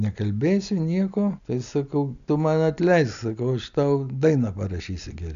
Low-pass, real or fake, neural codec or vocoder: 7.2 kHz; real; none